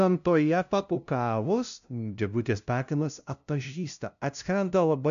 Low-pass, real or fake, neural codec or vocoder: 7.2 kHz; fake; codec, 16 kHz, 0.5 kbps, FunCodec, trained on LibriTTS, 25 frames a second